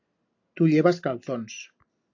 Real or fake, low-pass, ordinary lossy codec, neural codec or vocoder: real; 7.2 kHz; AAC, 48 kbps; none